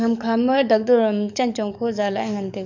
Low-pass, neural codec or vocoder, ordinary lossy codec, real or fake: 7.2 kHz; codec, 44.1 kHz, 7.8 kbps, DAC; none; fake